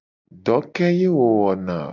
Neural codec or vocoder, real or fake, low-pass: none; real; 7.2 kHz